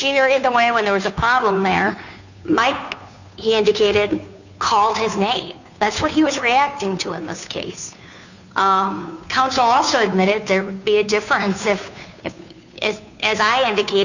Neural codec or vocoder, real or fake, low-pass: codec, 16 kHz, 2 kbps, FunCodec, trained on Chinese and English, 25 frames a second; fake; 7.2 kHz